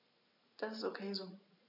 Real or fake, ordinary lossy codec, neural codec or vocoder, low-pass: real; none; none; 5.4 kHz